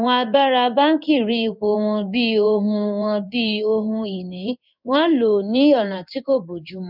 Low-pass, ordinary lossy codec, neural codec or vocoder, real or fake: 5.4 kHz; none; codec, 16 kHz in and 24 kHz out, 1 kbps, XY-Tokenizer; fake